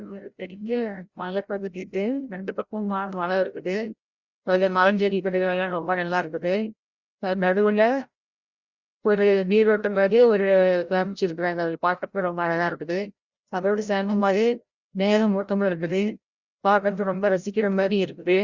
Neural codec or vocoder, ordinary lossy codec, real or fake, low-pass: codec, 16 kHz, 0.5 kbps, FreqCodec, larger model; Opus, 64 kbps; fake; 7.2 kHz